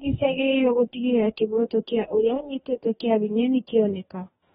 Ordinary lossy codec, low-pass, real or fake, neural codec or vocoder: AAC, 16 kbps; 19.8 kHz; fake; codec, 44.1 kHz, 2.6 kbps, DAC